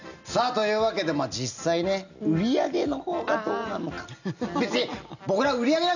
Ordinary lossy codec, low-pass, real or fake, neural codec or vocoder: none; 7.2 kHz; real; none